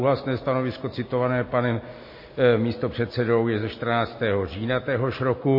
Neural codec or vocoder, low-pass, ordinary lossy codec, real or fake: none; 5.4 kHz; MP3, 24 kbps; real